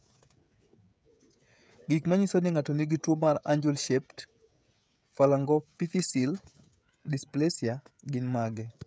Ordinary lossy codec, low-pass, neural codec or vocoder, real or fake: none; none; codec, 16 kHz, 16 kbps, FreqCodec, smaller model; fake